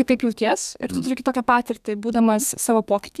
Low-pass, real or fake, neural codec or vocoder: 14.4 kHz; fake; codec, 32 kHz, 1.9 kbps, SNAC